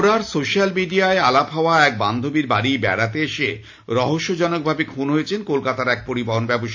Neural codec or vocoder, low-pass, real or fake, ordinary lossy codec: none; 7.2 kHz; real; AAC, 48 kbps